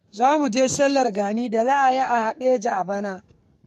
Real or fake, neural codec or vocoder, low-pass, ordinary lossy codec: fake; codec, 44.1 kHz, 2.6 kbps, SNAC; 14.4 kHz; MP3, 64 kbps